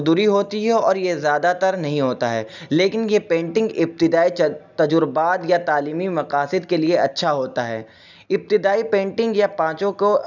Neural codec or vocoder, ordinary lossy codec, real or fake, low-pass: none; none; real; 7.2 kHz